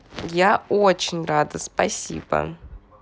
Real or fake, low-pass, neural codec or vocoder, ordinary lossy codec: real; none; none; none